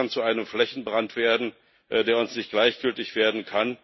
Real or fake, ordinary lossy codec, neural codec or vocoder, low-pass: real; MP3, 24 kbps; none; 7.2 kHz